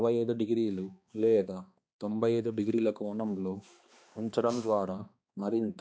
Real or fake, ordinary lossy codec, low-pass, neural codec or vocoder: fake; none; none; codec, 16 kHz, 2 kbps, X-Codec, HuBERT features, trained on balanced general audio